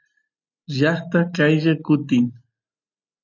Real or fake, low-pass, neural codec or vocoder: real; 7.2 kHz; none